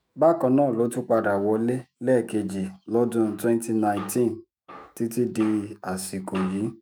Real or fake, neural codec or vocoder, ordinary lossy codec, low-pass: fake; autoencoder, 48 kHz, 128 numbers a frame, DAC-VAE, trained on Japanese speech; none; none